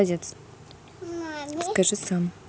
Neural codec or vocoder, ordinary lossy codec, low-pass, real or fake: none; none; none; real